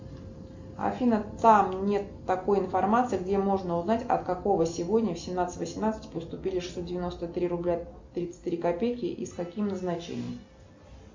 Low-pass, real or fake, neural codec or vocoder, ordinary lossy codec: 7.2 kHz; real; none; MP3, 64 kbps